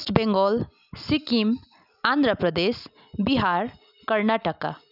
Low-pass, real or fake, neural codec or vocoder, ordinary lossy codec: 5.4 kHz; real; none; none